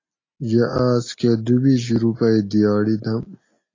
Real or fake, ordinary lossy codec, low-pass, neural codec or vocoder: real; AAC, 32 kbps; 7.2 kHz; none